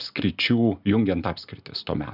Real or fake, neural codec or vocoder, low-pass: real; none; 5.4 kHz